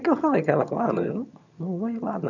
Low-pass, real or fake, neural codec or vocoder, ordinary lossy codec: 7.2 kHz; fake; vocoder, 22.05 kHz, 80 mel bands, HiFi-GAN; none